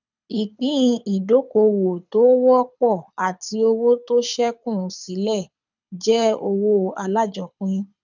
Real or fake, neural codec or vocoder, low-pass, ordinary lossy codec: fake; codec, 24 kHz, 6 kbps, HILCodec; 7.2 kHz; none